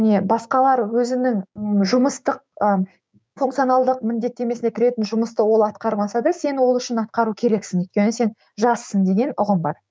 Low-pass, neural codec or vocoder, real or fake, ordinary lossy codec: none; codec, 16 kHz, 6 kbps, DAC; fake; none